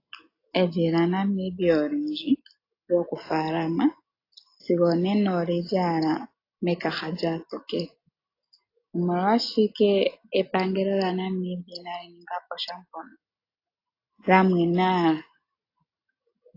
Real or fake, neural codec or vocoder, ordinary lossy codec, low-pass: real; none; AAC, 32 kbps; 5.4 kHz